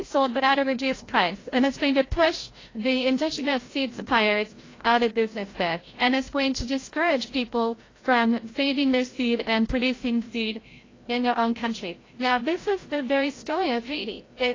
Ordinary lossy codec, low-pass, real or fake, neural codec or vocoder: AAC, 32 kbps; 7.2 kHz; fake; codec, 16 kHz, 0.5 kbps, FreqCodec, larger model